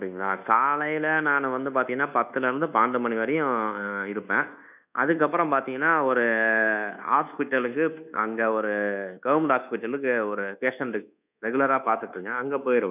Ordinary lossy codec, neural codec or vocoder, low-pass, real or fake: none; codec, 24 kHz, 1.2 kbps, DualCodec; 3.6 kHz; fake